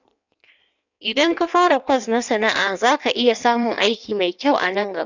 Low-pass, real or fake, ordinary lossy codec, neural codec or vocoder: 7.2 kHz; fake; none; codec, 16 kHz in and 24 kHz out, 1.1 kbps, FireRedTTS-2 codec